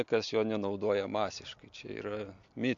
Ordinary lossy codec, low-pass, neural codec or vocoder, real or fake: AAC, 64 kbps; 7.2 kHz; none; real